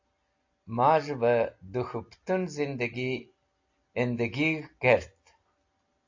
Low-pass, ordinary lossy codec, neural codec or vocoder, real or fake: 7.2 kHz; AAC, 48 kbps; none; real